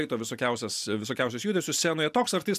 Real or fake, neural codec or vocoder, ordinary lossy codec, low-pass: real; none; AAC, 96 kbps; 14.4 kHz